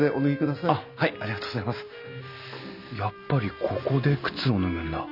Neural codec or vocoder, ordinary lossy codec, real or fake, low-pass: none; none; real; 5.4 kHz